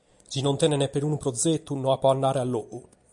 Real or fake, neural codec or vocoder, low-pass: real; none; 10.8 kHz